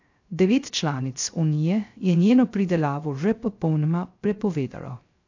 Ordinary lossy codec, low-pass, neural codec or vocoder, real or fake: AAC, 48 kbps; 7.2 kHz; codec, 16 kHz, 0.3 kbps, FocalCodec; fake